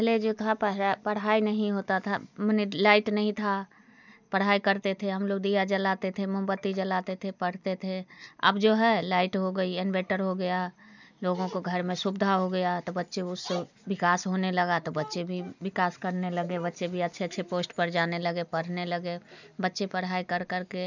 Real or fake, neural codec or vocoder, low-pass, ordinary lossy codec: real; none; 7.2 kHz; none